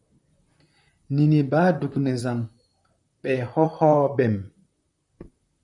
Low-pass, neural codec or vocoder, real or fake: 10.8 kHz; vocoder, 44.1 kHz, 128 mel bands, Pupu-Vocoder; fake